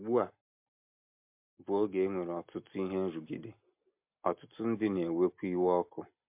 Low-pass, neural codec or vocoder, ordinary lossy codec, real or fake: 3.6 kHz; none; MP3, 32 kbps; real